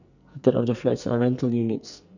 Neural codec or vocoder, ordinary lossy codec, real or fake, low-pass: codec, 44.1 kHz, 2.6 kbps, SNAC; none; fake; 7.2 kHz